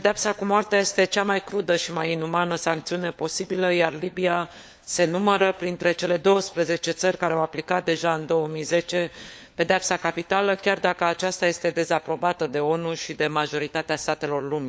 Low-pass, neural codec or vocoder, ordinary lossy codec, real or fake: none; codec, 16 kHz, 4 kbps, FunCodec, trained on LibriTTS, 50 frames a second; none; fake